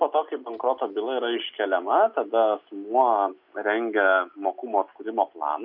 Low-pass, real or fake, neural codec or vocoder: 5.4 kHz; real; none